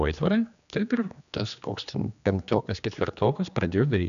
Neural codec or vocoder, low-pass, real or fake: codec, 16 kHz, 2 kbps, X-Codec, HuBERT features, trained on general audio; 7.2 kHz; fake